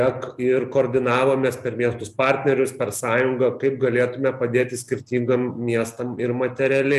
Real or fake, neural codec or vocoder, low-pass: real; none; 14.4 kHz